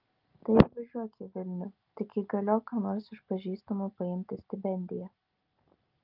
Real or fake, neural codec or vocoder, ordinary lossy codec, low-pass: real; none; Opus, 32 kbps; 5.4 kHz